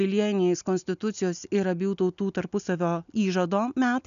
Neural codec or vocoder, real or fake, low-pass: none; real; 7.2 kHz